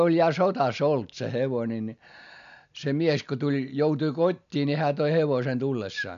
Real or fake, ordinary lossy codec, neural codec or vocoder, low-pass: real; none; none; 7.2 kHz